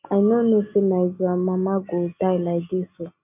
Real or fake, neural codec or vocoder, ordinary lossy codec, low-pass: real; none; none; 3.6 kHz